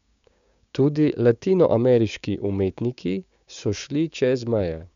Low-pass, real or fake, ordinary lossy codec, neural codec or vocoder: 7.2 kHz; fake; MP3, 64 kbps; codec, 16 kHz, 6 kbps, DAC